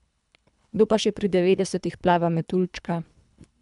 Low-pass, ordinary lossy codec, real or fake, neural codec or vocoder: 10.8 kHz; none; fake; codec, 24 kHz, 3 kbps, HILCodec